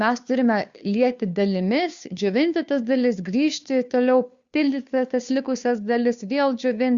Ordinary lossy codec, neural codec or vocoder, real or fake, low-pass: Opus, 64 kbps; codec, 16 kHz, 2 kbps, FunCodec, trained on Chinese and English, 25 frames a second; fake; 7.2 kHz